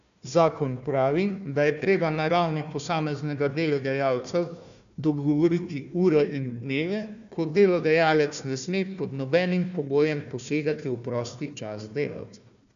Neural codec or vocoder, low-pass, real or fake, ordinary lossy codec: codec, 16 kHz, 1 kbps, FunCodec, trained on Chinese and English, 50 frames a second; 7.2 kHz; fake; MP3, 96 kbps